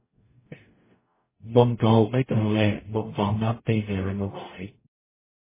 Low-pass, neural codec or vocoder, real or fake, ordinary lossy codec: 3.6 kHz; codec, 44.1 kHz, 0.9 kbps, DAC; fake; MP3, 16 kbps